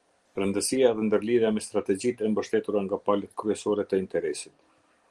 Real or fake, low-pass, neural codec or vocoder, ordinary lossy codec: real; 10.8 kHz; none; Opus, 24 kbps